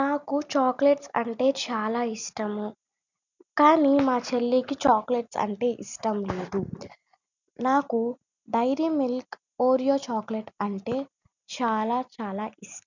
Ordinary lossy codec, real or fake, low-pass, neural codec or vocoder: none; real; 7.2 kHz; none